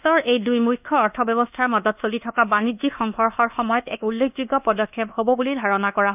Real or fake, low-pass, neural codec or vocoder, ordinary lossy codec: fake; 3.6 kHz; codec, 24 kHz, 1.2 kbps, DualCodec; none